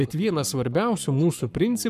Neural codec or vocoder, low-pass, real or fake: codec, 44.1 kHz, 7.8 kbps, Pupu-Codec; 14.4 kHz; fake